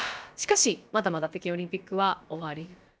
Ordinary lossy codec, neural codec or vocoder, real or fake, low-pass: none; codec, 16 kHz, about 1 kbps, DyCAST, with the encoder's durations; fake; none